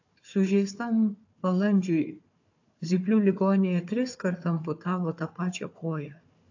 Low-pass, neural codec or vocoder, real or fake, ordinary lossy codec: 7.2 kHz; codec, 16 kHz, 4 kbps, FunCodec, trained on Chinese and English, 50 frames a second; fake; AAC, 48 kbps